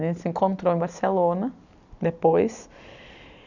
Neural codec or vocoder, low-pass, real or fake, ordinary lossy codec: none; 7.2 kHz; real; none